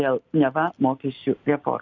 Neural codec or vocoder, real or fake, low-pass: none; real; 7.2 kHz